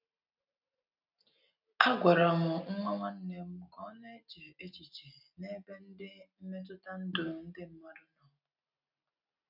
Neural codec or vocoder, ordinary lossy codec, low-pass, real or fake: none; none; 5.4 kHz; real